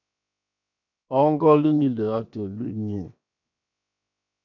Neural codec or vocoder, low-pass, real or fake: codec, 16 kHz, 0.7 kbps, FocalCodec; 7.2 kHz; fake